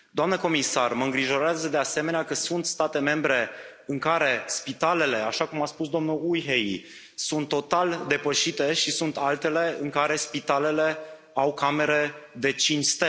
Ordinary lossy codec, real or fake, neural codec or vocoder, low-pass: none; real; none; none